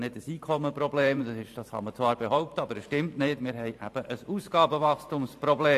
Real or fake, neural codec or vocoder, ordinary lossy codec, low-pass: fake; vocoder, 44.1 kHz, 128 mel bands every 512 samples, BigVGAN v2; AAC, 64 kbps; 14.4 kHz